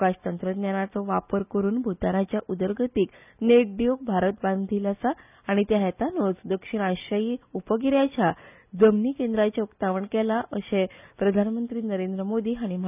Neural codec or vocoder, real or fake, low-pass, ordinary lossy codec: none; real; 3.6 kHz; none